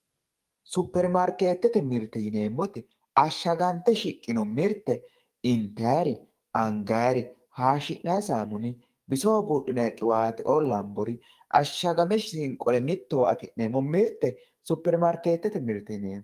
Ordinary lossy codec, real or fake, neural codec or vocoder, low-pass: Opus, 32 kbps; fake; codec, 44.1 kHz, 2.6 kbps, SNAC; 14.4 kHz